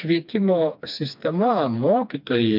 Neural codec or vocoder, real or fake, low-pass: codec, 16 kHz, 2 kbps, FreqCodec, smaller model; fake; 5.4 kHz